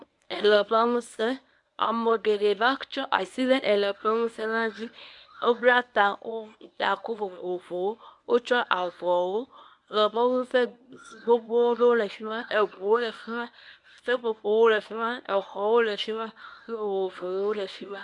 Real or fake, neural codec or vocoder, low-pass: fake; codec, 24 kHz, 0.9 kbps, WavTokenizer, medium speech release version 1; 10.8 kHz